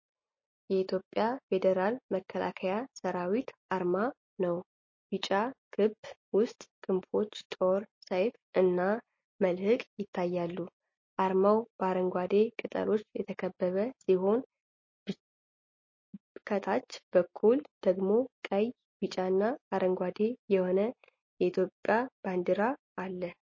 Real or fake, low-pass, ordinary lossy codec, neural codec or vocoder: real; 7.2 kHz; MP3, 32 kbps; none